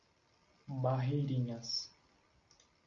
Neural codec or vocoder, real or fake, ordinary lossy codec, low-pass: none; real; Opus, 64 kbps; 7.2 kHz